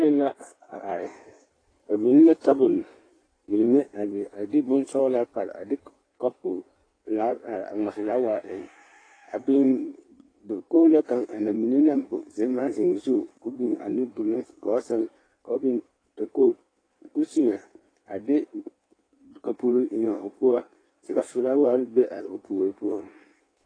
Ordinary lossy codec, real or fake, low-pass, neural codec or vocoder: AAC, 32 kbps; fake; 9.9 kHz; codec, 16 kHz in and 24 kHz out, 1.1 kbps, FireRedTTS-2 codec